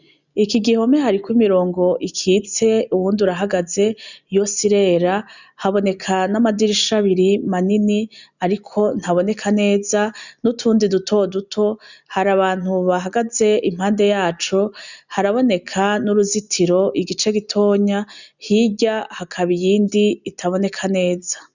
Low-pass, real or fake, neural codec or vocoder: 7.2 kHz; real; none